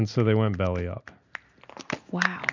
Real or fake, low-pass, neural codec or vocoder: real; 7.2 kHz; none